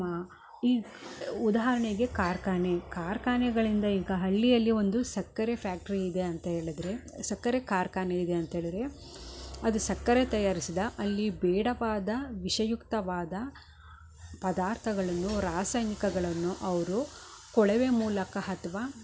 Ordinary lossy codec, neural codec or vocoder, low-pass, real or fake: none; none; none; real